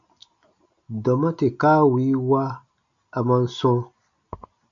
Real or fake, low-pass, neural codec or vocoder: real; 7.2 kHz; none